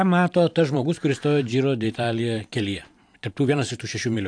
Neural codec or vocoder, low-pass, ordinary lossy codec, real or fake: vocoder, 44.1 kHz, 128 mel bands every 512 samples, BigVGAN v2; 9.9 kHz; AAC, 64 kbps; fake